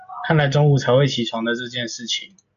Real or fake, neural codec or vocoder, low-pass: real; none; 7.2 kHz